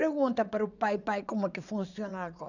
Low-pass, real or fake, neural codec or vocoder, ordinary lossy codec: 7.2 kHz; fake; vocoder, 22.05 kHz, 80 mel bands, WaveNeXt; none